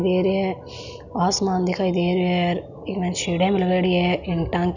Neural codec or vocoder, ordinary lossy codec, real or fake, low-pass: none; none; real; 7.2 kHz